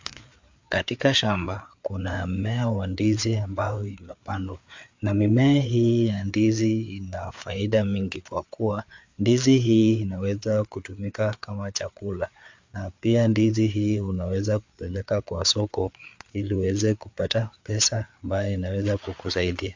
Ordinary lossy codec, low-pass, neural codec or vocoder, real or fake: MP3, 64 kbps; 7.2 kHz; codec, 16 kHz, 4 kbps, FreqCodec, larger model; fake